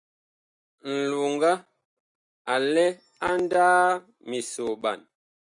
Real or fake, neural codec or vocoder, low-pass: real; none; 10.8 kHz